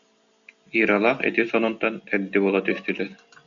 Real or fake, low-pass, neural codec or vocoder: real; 7.2 kHz; none